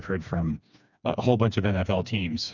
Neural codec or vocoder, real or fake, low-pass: codec, 16 kHz, 2 kbps, FreqCodec, smaller model; fake; 7.2 kHz